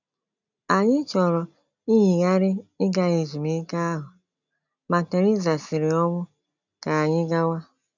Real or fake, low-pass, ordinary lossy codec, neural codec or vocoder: real; 7.2 kHz; none; none